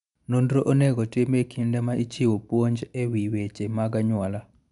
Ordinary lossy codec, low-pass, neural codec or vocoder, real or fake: none; 10.8 kHz; none; real